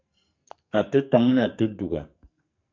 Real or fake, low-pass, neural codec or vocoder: fake; 7.2 kHz; codec, 44.1 kHz, 2.6 kbps, SNAC